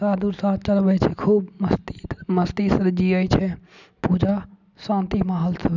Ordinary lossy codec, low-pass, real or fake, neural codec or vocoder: none; 7.2 kHz; real; none